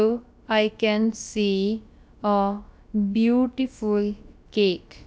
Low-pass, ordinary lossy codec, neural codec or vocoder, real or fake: none; none; codec, 16 kHz, about 1 kbps, DyCAST, with the encoder's durations; fake